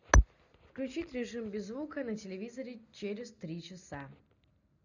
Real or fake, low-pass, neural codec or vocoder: real; 7.2 kHz; none